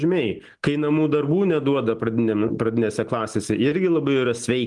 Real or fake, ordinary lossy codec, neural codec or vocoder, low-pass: real; Opus, 24 kbps; none; 10.8 kHz